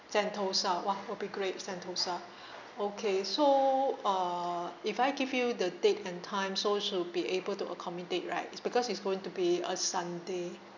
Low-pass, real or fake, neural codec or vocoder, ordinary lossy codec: 7.2 kHz; real; none; none